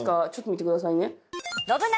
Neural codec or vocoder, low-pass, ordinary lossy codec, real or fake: none; none; none; real